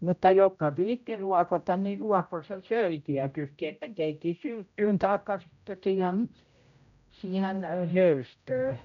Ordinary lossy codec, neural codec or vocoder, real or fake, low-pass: none; codec, 16 kHz, 0.5 kbps, X-Codec, HuBERT features, trained on general audio; fake; 7.2 kHz